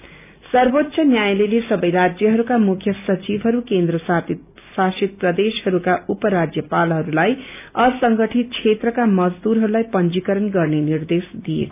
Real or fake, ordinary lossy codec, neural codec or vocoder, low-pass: real; none; none; 3.6 kHz